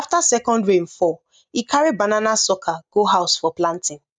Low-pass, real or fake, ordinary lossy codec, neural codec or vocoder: 9.9 kHz; real; none; none